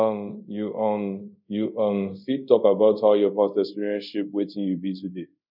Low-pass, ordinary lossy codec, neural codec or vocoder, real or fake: 5.4 kHz; none; codec, 24 kHz, 0.5 kbps, DualCodec; fake